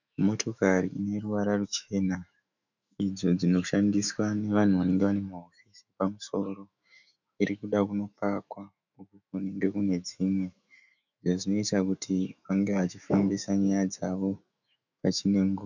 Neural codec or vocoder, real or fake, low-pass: autoencoder, 48 kHz, 128 numbers a frame, DAC-VAE, trained on Japanese speech; fake; 7.2 kHz